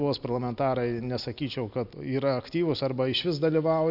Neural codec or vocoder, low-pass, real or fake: none; 5.4 kHz; real